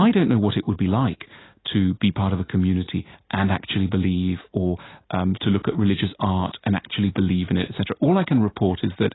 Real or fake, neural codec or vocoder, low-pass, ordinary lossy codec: real; none; 7.2 kHz; AAC, 16 kbps